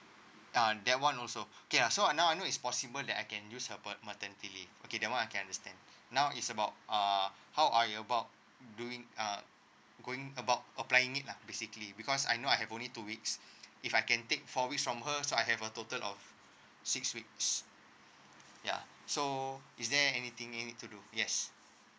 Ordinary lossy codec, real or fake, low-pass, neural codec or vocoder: none; real; none; none